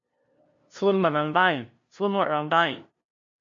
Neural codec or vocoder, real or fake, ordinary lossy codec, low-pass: codec, 16 kHz, 0.5 kbps, FunCodec, trained on LibriTTS, 25 frames a second; fake; MP3, 48 kbps; 7.2 kHz